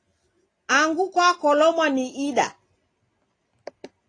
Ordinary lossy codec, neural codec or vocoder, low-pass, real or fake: AAC, 32 kbps; none; 9.9 kHz; real